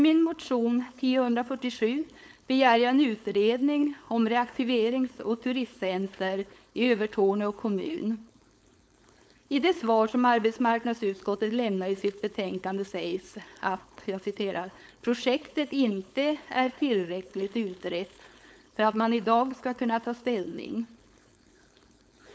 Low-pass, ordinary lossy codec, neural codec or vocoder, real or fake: none; none; codec, 16 kHz, 4.8 kbps, FACodec; fake